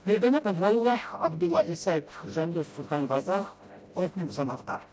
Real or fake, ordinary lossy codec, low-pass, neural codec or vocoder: fake; none; none; codec, 16 kHz, 0.5 kbps, FreqCodec, smaller model